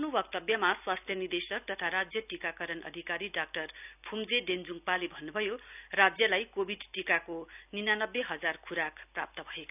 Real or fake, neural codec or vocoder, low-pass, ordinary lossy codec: real; none; 3.6 kHz; none